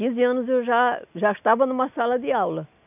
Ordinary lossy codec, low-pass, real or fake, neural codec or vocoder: none; 3.6 kHz; real; none